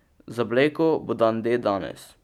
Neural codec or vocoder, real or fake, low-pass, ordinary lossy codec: none; real; 19.8 kHz; none